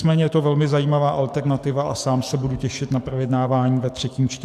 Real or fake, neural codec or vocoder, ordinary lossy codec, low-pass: fake; codec, 44.1 kHz, 7.8 kbps, DAC; AAC, 96 kbps; 14.4 kHz